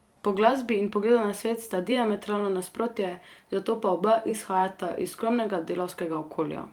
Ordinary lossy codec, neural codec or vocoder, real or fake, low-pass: Opus, 32 kbps; vocoder, 44.1 kHz, 128 mel bands every 512 samples, BigVGAN v2; fake; 19.8 kHz